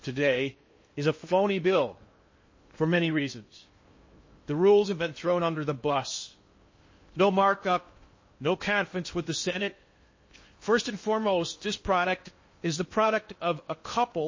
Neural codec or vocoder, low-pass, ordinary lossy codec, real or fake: codec, 16 kHz in and 24 kHz out, 0.6 kbps, FocalCodec, streaming, 2048 codes; 7.2 kHz; MP3, 32 kbps; fake